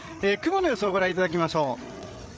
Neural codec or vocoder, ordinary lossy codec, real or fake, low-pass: codec, 16 kHz, 8 kbps, FreqCodec, larger model; none; fake; none